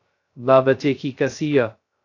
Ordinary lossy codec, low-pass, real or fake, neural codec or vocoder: AAC, 48 kbps; 7.2 kHz; fake; codec, 16 kHz, 0.2 kbps, FocalCodec